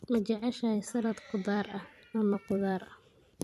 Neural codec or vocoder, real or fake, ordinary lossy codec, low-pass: vocoder, 44.1 kHz, 128 mel bands every 256 samples, BigVGAN v2; fake; none; 14.4 kHz